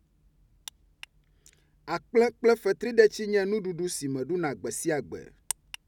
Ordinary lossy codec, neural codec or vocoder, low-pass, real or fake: none; none; 19.8 kHz; real